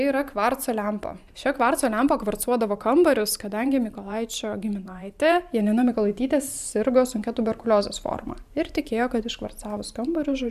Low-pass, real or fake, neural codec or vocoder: 14.4 kHz; fake; vocoder, 44.1 kHz, 128 mel bands every 512 samples, BigVGAN v2